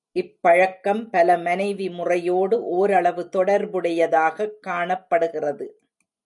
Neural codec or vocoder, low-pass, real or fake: none; 10.8 kHz; real